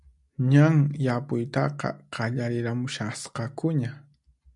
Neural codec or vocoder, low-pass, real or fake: none; 10.8 kHz; real